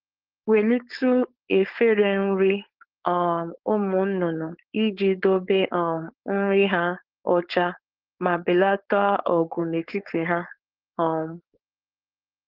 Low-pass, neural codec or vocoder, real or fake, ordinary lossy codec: 5.4 kHz; codec, 16 kHz, 4.8 kbps, FACodec; fake; Opus, 16 kbps